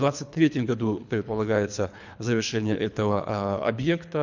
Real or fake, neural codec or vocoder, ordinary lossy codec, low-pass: fake; codec, 24 kHz, 3 kbps, HILCodec; none; 7.2 kHz